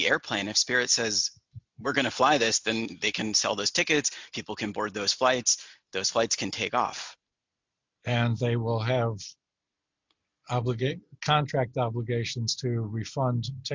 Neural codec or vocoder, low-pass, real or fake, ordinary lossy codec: none; 7.2 kHz; real; MP3, 64 kbps